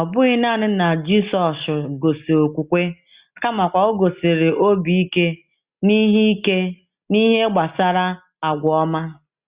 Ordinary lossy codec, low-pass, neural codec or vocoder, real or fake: Opus, 64 kbps; 3.6 kHz; none; real